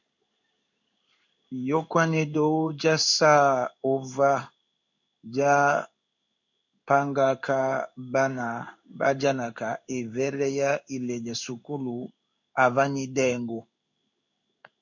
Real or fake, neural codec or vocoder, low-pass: fake; codec, 16 kHz in and 24 kHz out, 1 kbps, XY-Tokenizer; 7.2 kHz